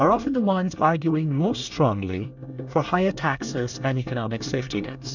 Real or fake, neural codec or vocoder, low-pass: fake; codec, 24 kHz, 1 kbps, SNAC; 7.2 kHz